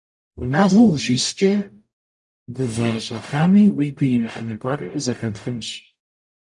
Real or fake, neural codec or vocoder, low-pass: fake; codec, 44.1 kHz, 0.9 kbps, DAC; 10.8 kHz